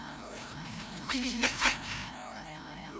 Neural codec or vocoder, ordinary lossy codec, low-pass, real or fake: codec, 16 kHz, 0.5 kbps, FreqCodec, larger model; none; none; fake